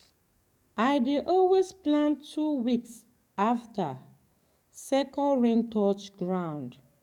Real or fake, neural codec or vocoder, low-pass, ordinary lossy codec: fake; codec, 44.1 kHz, 7.8 kbps, DAC; 19.8 kHz; none